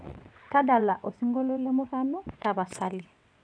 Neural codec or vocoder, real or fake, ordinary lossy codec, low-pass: vocoder, 22.05 kHz, 80 mel bands, WaveNeXt; fake; none; 9.9 kHz